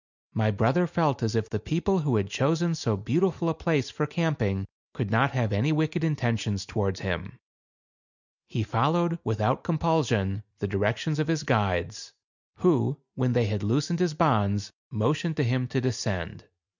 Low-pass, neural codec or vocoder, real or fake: 7.2 kHz; none; real